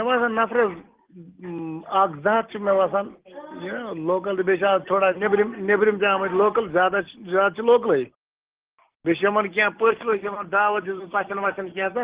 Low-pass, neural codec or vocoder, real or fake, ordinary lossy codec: 3.6 kHz; none; real; Opus, 16 kbps